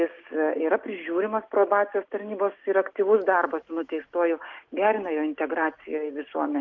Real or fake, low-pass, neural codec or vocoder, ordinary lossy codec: real; 7.2 kHz; none; Opus, 32 kbps